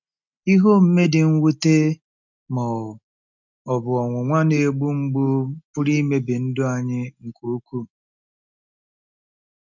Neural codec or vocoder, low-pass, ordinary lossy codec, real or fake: none; 7.2 kHz; none; real